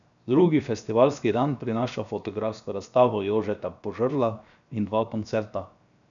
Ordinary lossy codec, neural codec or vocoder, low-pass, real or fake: none; codec, 16 kHz, 0.7 kbps, FocalCodec; 7.2 kHz; fake